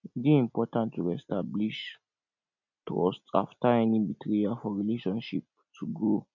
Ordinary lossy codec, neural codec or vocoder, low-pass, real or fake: none; none; 7.2 kHz; real